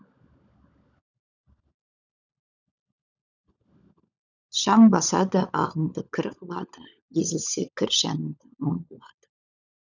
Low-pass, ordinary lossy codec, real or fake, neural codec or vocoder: 7.2 kHz; none; fake; codec, 16 kHz, 16 kbps, FunCodec, trained on LibriTTS, 50 frames a second